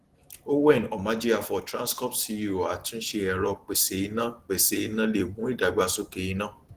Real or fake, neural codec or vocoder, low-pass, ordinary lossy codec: real; none; 14.4 kHz; Opus, 16 kbps